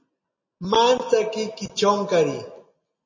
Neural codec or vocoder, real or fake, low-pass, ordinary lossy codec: none; real; 7.2 kHz; MP3, 32 kbps